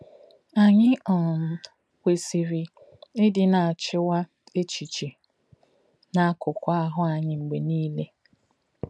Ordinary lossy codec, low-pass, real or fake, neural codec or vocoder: none; none; real; none